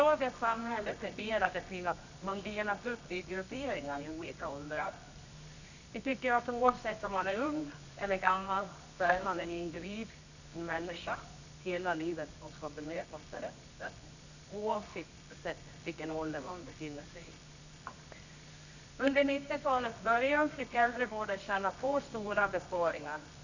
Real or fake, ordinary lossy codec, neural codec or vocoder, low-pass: fake; none; codec, 24 kHz, 0.9 kbps, WavTokenizer, medium music audio release; 7.2 kHz